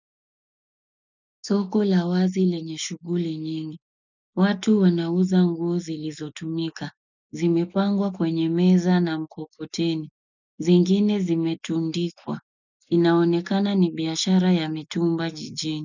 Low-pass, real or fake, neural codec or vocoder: 7.2 kHz; real; none